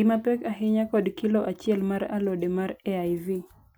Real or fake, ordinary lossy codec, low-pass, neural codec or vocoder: real; none; none; none